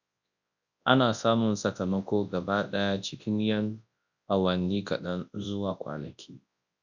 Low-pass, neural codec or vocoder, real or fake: 7.2 kHz; codec, 24 kHz, 0.9 kbps, WavTokenizer, large speech release; fake